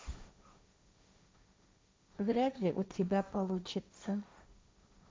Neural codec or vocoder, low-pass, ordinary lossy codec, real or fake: codec, 16 kHz, 1.1 kbps, Voila-Tokenizer; none; none; fake